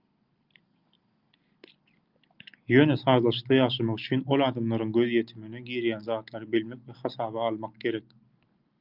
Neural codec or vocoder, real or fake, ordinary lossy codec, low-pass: none; real; Opus, 32 kbps; 5.4 kHz